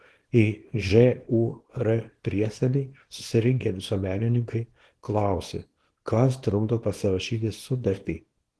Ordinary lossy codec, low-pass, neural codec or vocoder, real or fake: Opus, 16 kbps; 10.8 kHz; codec, 24 kHz, 0.9 kbps, WavTokenizer, small release; fake